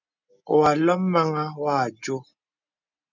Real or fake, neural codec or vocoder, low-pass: real; none; 7.2 kHz